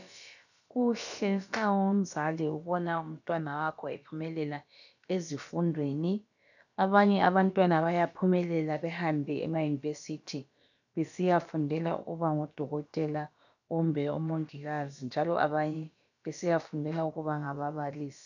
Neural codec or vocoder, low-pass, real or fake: codec, 16 kHz, about 1 kbps, DyCAST, with the encoder's durations; 7.2 kHz; fake